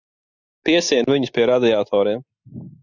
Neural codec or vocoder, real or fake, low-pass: none; real; 7.2 kHz